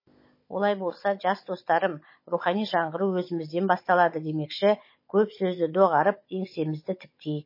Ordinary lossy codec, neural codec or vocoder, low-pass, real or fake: MP3, 24 kbps; codec, 16 kHz, 16 kbps, FunCodec, trained on Chinese and English, 50 frames a second; 5.4 kHz; fake